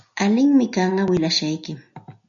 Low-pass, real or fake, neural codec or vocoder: 7.2 kHz; real; none